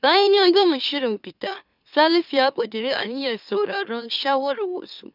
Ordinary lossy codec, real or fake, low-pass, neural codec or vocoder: none; fake; 5.4 kHz; autoencoder, 44.1 kHz, a latent of 192 numbers a frame, MeloTTS